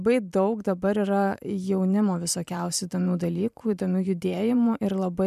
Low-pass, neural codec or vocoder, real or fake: 14.4 kHz; vocoder, 44.1 kHz, 128 mel bands every 512 samples, BigVGAN v2; fake